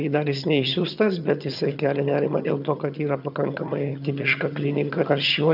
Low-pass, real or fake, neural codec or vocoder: 5.4 kHz; fake; vocoder, 22.05 kHz, 80 mel bands, HiFi-GAN